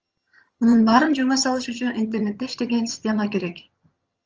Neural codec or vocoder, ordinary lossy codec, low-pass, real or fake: vocoder, 22.05 kHz, 80 mel bands, HiFi-GAN; Opus, 24 kbps; 7.2 kHz; fake